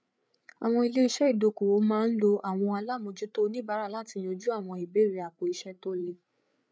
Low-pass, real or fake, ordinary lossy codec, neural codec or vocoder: none; fake; none; codec, 16 kHz, 8 kbps, FreqCodec, larger model